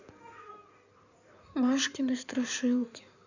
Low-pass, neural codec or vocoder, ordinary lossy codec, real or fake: 7.2 kHz; codec, 16 kHz in and 24 kHz out, 2.2 kbps, FireRedTTS-2 codec; none; fake